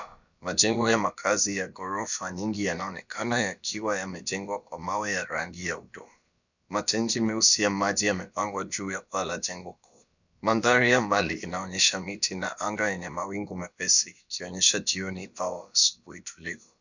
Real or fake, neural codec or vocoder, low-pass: fake; codec, 16 kHz, about 1 kbps, DyCAST, with the encoder's durations; 7.2 kHz